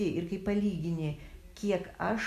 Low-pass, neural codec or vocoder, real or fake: 14.4 kHz; none; real